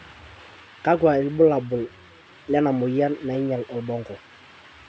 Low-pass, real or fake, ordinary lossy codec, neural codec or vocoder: none; real; none; none